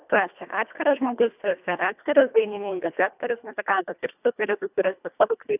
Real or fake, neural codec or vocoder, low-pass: fake; codec, 24 kHz, 1.5 kbps, HILCodec; 3.6 kHz